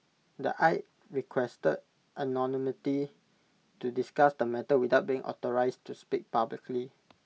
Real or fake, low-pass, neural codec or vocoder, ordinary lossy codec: real; none; none; none